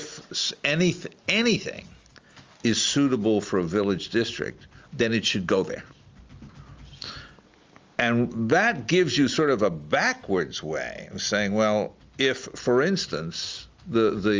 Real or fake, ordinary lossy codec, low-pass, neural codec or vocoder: real; Opus, 32 kbps; 7.2 kHz; none